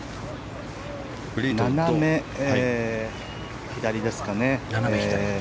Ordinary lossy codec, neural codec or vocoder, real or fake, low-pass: none; none; real; none